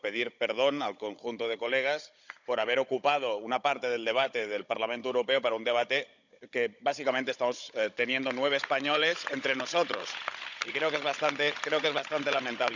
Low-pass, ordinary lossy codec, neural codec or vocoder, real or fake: 7.2 kHz; none; codec, 16 kHz, 16 kbps, FunCodec, trained on Chinese and English, 50 frames a second; fake